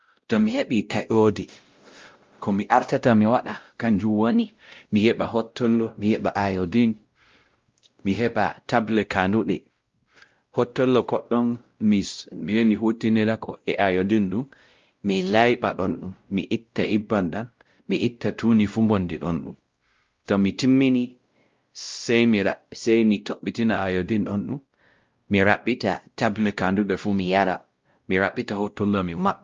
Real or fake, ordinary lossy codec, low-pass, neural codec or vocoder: fake; Opus, 32 kbps; 7.2 kHz; codec, 16 kHz, 0.5 kbps, X-Codec, WavLM features, trained on Multilingual LibriSpeech